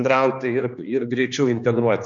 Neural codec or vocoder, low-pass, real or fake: codec, 16 kHz, 2 kbps, X-Codec, HuBERT features, trained on general audio; 7.2 kHz; fake